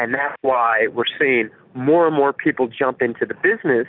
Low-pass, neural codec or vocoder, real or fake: 5.4 kHz; none; real